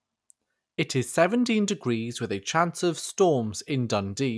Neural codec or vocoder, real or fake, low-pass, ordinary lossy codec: none; real; none; none